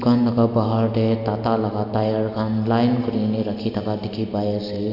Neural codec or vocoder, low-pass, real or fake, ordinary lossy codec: none; 5.4 kHz; real; none